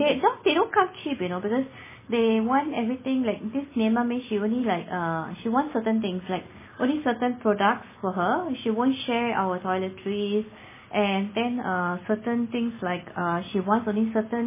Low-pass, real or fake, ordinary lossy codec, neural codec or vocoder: 3.6 kHz; real; MP3, 16 kbps; none